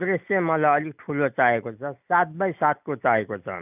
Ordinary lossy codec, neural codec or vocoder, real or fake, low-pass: none; none; real; 3.6 kHz